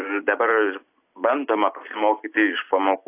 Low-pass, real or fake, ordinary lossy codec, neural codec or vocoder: 3.6 kHz; real; AAC, 24 kbps; none